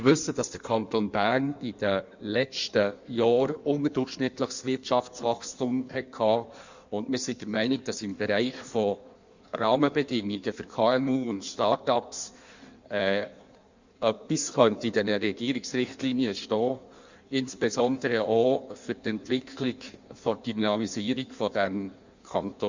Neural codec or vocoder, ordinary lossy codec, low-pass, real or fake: codec, 16 kHz in and 24 kHz out, 1.1 kbps, FireRedTTS-2 codec; Opus, 64 kbps; 7.2 kHz; fake